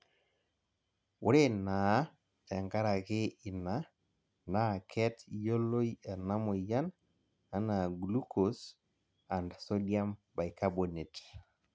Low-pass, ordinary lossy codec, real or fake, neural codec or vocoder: none; none; real; none